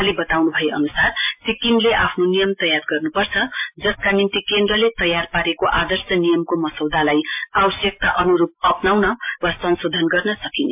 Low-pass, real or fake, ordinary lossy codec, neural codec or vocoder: 3.6 kHz; real; MP3, 32 kbps; none